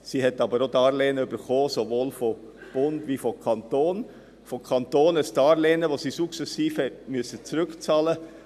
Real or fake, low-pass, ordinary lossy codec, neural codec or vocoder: real; 14.4 kHz; none; none